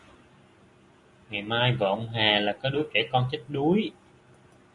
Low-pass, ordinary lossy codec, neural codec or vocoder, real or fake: 10.8 kHz; MP3, 48 kbps; none; real